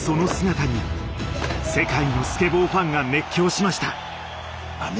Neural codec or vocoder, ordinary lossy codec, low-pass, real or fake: none; none; none; real